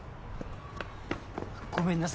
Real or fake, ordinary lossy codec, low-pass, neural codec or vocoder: real; none; none; none